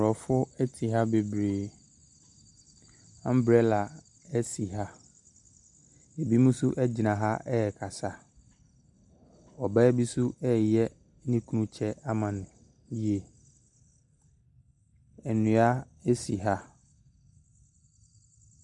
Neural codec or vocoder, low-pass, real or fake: none; 10.8 kHz; real